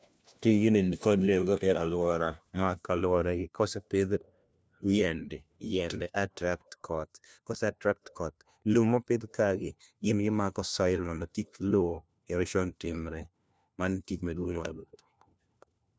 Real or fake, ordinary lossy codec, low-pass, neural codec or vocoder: fake; none; none; codec, 16 kHz, 1 kbps, FunCodec, trained on LibriTTS, 50 frames a second